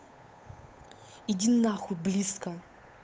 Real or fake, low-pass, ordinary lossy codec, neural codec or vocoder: fake; none; none; codec, 16 kHz, 8 kbps, FunCodec, trained on Chinese and English, 25 frames a second